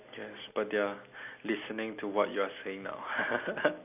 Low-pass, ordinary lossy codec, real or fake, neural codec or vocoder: 3.6 kHz; AAC, 32 kbps; real; none